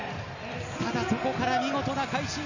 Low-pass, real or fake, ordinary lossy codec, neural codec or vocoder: 7.2 kHz; real; none; none